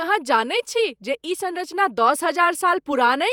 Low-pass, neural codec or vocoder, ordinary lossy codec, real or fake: 19.8 kHz; vocoder, 48 kHz, 128 mel bands, Vocos; none; fake